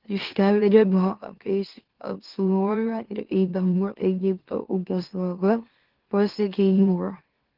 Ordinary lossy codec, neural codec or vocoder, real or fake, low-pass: Opus, 16 kbps; autoencoder, 44.1 kHz, a latent of 192 numbers a frame, MeloTTS; fake; 5.4 kHz